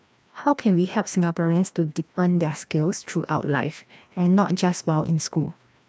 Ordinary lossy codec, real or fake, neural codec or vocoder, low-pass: none; fake; codec, 16 kHz, 1 kbps, FreqCodec, larger model; none